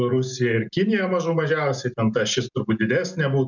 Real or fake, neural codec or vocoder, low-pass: real; none; 7.2 kHz